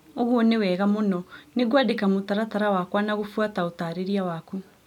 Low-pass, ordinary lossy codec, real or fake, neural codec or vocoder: 19.8 kHz; none; real; none